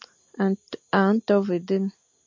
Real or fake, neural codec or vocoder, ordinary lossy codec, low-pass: real; none; MP3, 48 kbps; 7.2 kHz